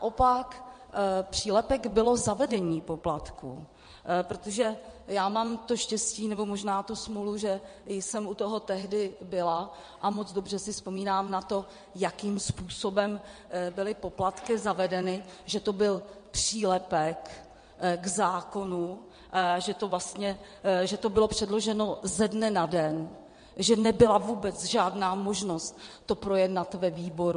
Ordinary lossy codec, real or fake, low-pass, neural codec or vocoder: MP3, 48 kbps; fake; 9.9 kHz; vocoder, 22.05 kHz, 80 mel bands, WaveNeXt